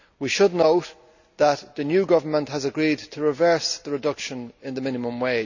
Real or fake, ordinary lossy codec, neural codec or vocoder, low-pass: real; none; none; 7.2 kHz